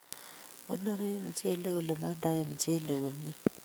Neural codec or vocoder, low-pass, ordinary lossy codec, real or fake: codec, 44.1 kHz, 2.6 kbps, SNAC; none; none; fake